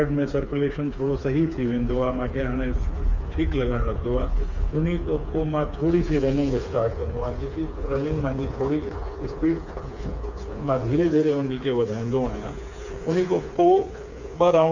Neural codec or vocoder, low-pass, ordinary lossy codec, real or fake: codec, 16 kHz, 4 kbps, FreqCodec, smaller model; 7.2 kHz; none; fake